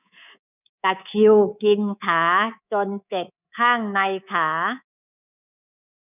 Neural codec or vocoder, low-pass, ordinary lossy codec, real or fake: codec, 24 kHz, 3.1 kbps, DualCodec; 3.6 kHz; none; fake